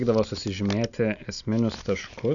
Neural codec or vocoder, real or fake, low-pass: none; real; 7.2 kHz